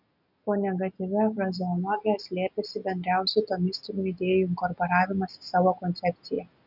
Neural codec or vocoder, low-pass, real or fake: none; 5.4 kHz; real